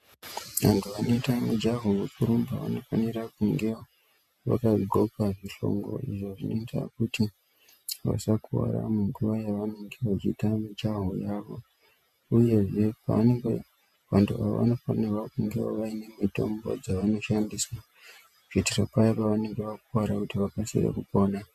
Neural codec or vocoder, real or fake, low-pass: vocoder, 48 kHz, 128 mel bands, Vocos; fake; 14.4 kHz